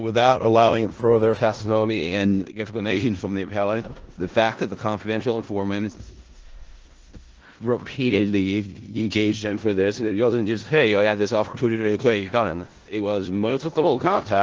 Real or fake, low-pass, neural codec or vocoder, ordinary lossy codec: fake; 7.2 kHz; codec, 16 kHz in and 24 kHz out, 0.4 kbps, LongCat-Audio-Codec, four codebook decoder; Opus, 16 kbps